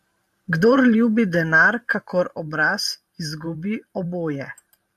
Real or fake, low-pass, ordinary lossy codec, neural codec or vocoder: fake; 14.4 kHz; AAC, 96 kbps; vocoder, 44.1 kHz, 128 mel bands every 512 samples, BigVGAN v2